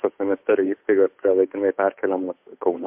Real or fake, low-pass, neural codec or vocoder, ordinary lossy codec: fake; 3.6 kHz; codec, 16 kHz, 8 kbps, FunCodec, trained on Chinese and English, 25 frames a second; MP3, 32 kbps